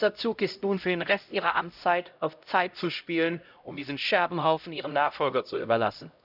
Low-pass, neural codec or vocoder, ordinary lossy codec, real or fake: 5.4 kHz; codec, 16 kHz, 0.5 kbps, X-Codec, HuBERT features, trained on LibriSpeech; none; fake